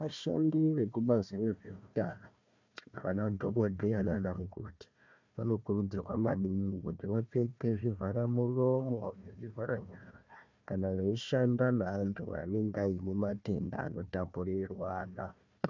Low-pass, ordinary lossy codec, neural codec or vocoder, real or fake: 7.2 kHz; MP3, 64 kbps; codec, 16 kHz, 1 kbps, FunCodec, trained on Chinese and English, 50 frames a second; fake